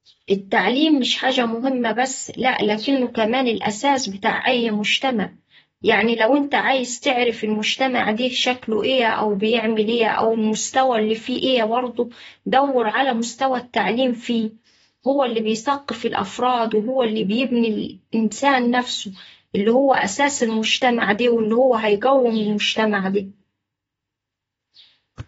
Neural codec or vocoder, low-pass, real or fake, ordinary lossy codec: vocoder, 44.1 kHz, 128 mel bands, Pupu-Vocoder; 19.8 kHz; fake; AAC, 24 kbps